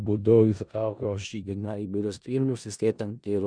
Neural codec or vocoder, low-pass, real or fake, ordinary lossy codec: codec, 16 kHz in and 24 kHz out, 0.4 kbps, LongCat-Audio-Codec, four codebook decoder; 9.9 kHz; fake; Opus, 64 kbps